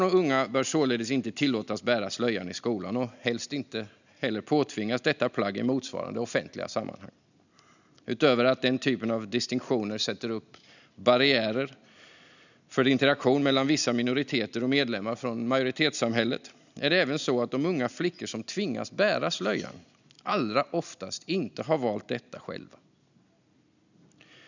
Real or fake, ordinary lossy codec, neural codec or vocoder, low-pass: real; none; none; 7.2 kHz